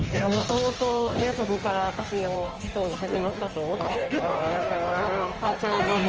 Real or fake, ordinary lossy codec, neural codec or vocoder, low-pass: fake; Opus, 24 kbps; codec, 16 kHz in and 24 kHz out, 1.1 kbps, FireRedTTS-2 codec; 7.2 kHz